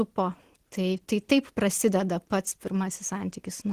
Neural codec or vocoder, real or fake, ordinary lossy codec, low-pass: none; real; Opus, 16 kbps; 14.4 kHz